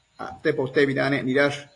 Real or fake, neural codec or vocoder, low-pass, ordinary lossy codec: real; none; 10.8 kHz; AAC, 48 kbps